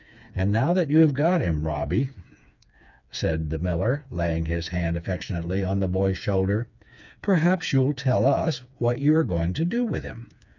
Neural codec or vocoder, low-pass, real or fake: codec, 16 kHz, 4 kbps, FreqCodec, smaller model; 7.2 kHz; fake